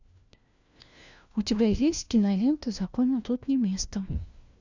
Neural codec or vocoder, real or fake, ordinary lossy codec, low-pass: codec, 16 kHz, 1 kbps, FunCodec, trained on LibriTTS, 50 frames a second; fake; Opus, 64 kbps; 7.2 kHz